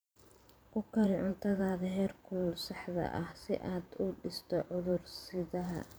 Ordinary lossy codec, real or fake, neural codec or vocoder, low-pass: none; fake; vocoder, 44.1 kHz, 128 mel bands every 512 samples, BigVGAN v2; none